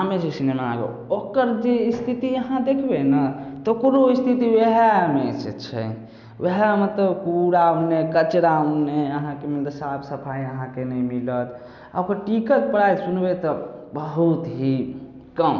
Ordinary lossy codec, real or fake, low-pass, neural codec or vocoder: none; real; 7.2 kHz; none